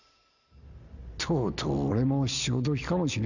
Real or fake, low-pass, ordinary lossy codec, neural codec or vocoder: real; 7.2 kHz; none; none